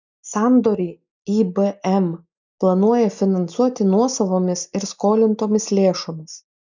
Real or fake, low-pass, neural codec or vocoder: real; 7.2 kHz; none